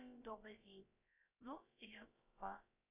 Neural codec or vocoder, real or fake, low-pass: codec, 16 kHz, about 1 kbps, DyCAST, with the encoder's durations; fake; 3.6 kHz